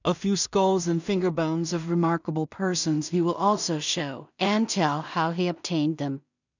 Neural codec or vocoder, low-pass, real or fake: codec, 16 kHz in and 24 kHz out, 0.4 kbps, LongCat-Audio-Codec, two codebook decoder; 7.2 kHz; fake